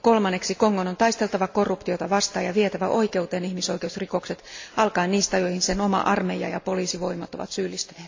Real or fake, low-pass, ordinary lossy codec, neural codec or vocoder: real; 7.2 kHz; AAC, 48 kbps; none